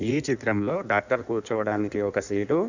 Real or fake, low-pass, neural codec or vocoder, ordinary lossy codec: fake; 7.2 kHz; codec, 16 kHz in and 24 kHz out, 1.1 kbps, FireRedTTS-2 codec; none